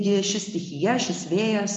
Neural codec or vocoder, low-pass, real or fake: none; 10.8 kHz; real